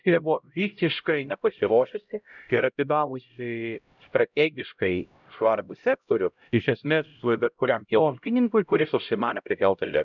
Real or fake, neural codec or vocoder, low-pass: fake; codec, 16 kHz, 0.5 kbps, X-Codec, HuBERT features, trained on LibriSpeech; 7.2 kHz